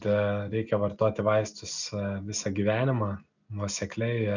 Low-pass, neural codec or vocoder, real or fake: 7.2 kHz; none; real